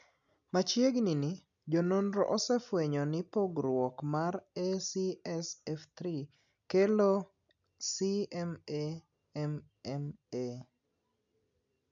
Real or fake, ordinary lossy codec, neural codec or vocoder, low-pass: real; none; none; 7.2 kHz